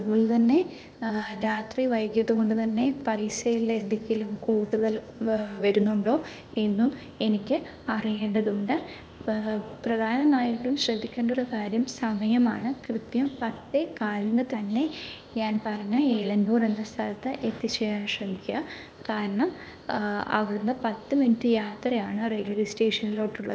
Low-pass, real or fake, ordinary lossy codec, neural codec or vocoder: none; fake; none; codec, 16 kHz, 0.8 kbps, ZipCodec